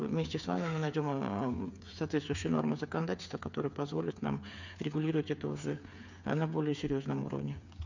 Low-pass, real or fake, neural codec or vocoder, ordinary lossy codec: 7.2 kHz; fake; codec, 16 kHz, 8 kbps, FreqCodec, smaller model; none